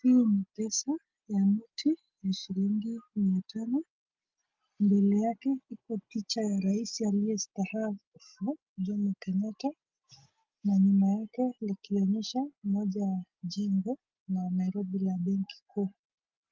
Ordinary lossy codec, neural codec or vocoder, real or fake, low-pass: Opus, 32 kbps; none; real; 7.2 kHz